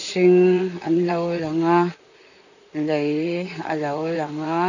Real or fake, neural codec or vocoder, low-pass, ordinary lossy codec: fake; vocoder, 44.1 kHz, 128 mel bands, Pupu-Vocoder; 7.2 kHz; none